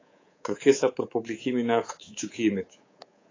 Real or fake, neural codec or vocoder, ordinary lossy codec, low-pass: fake; codec, 24 kHz, 3.1 kbps, DualCodec; AAC, 32 kbps; 7.2 kHz